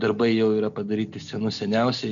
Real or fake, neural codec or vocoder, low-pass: real; none; 7.2 kHz